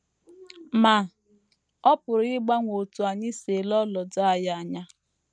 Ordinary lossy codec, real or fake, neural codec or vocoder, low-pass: none; real; none; 9.9 kHz